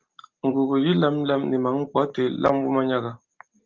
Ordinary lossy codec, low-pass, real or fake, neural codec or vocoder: Opus, 32 kbps; 7.2 kHz; real; none